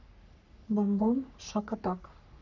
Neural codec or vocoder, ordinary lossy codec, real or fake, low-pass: codec, 44.1 kHz, 3.4 kbps, Pupu-Codec; none; fake; 7.2 kHz